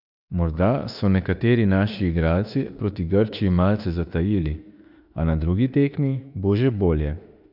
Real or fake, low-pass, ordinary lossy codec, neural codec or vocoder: fake; 5.4 kHz; none; autoencoder, 48 kHz, 32 numbers a frame, DAC-VAE, trained on Japanese speech